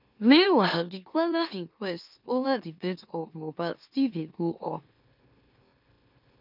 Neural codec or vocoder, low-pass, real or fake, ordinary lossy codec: autoencoder, 44.1 kHz, a latent of 192 numbers a frame, MeloTTS; 5.4 kHz; fake; none